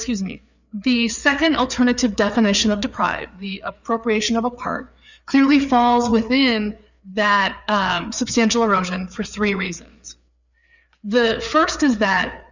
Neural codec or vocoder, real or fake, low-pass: codec, 16 kHz, 4 kbps, FreqCodec, larger model; fake; 7.2 kHz